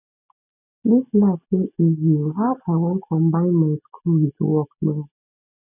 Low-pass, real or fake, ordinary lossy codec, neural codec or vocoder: 3.6 kHz; real; none; none